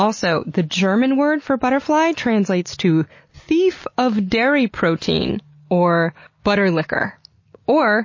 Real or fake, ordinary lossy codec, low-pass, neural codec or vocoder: real; MP3, 32 kbps; 7.2 kHz; none